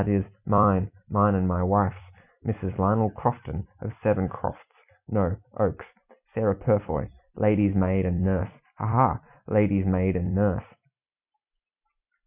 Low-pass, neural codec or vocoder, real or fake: 3.6 kHz; vocoder, 44.1 kHz, 128 mel bands every 256 samples, BigVGAN v2; fake